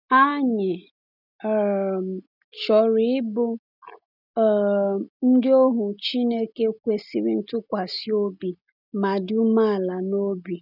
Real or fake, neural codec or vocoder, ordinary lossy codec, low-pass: real; none; none; 5.4 kHz